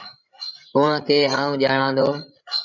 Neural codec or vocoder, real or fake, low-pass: codec, 16 kHz, 16 kbps, FreqCodec, larger model; fake; 7.2 kHz